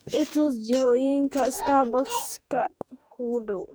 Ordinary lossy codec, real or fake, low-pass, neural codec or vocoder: none; fake; none; codec, 44.1 kHz, 2.6 kbps, DAC